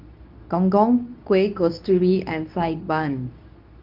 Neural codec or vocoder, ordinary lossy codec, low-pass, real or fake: codec, 24 kHz, 0.9 kbps, WavTokenizer, medium speech release version 2; Opus, 24 kbps; 5.4 kHz; fake